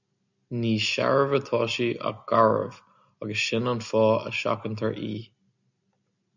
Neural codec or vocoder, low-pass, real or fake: none; 7.2 kHz; real